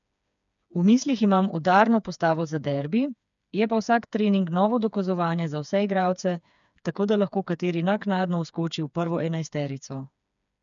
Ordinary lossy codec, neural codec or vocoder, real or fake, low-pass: none; codec, 16 kHz, 4 kbps, FreqCodec, smaller model; fake; 7.2 kHz